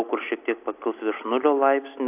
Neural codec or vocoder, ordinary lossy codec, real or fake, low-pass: none; AAC, 32 kbps; real; 3.6 kHz